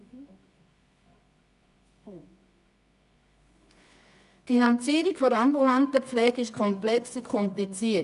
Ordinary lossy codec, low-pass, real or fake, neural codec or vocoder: none; 10.8 kHz; fake; codec, 24 kHz, 0.9 kbps, WavTokenizer, medium music audio release